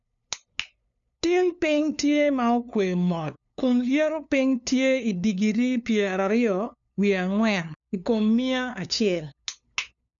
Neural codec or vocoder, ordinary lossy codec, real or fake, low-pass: codec, 16 kHz, 2 kbps, FunCodec, trained on LibriTTS, 25 frames a second; none; fake; 7.2 kHz